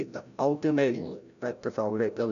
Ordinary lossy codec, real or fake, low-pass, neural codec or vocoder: none; fake; 7.2 kHz; codec, 16 kHz, 0.5 kbps, FreqCodec, larger model